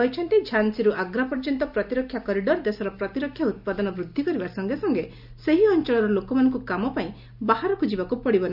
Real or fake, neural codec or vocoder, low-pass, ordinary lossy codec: real; none; 5.4 kHz; none